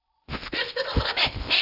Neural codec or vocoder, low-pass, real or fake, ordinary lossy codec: codec, 16 kHz in and 24 kHz out, 0.8 kbps, FocalCodec, streaming, 65536 codes; 5.4 kHz; fake; none